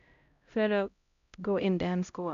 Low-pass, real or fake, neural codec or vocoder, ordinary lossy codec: 7.2 kHz; fake; codec, 16 kHz, 0.5 kbps, X-Codec, HuBERT features, trained on LibriSpeech; AAC, 96 kbps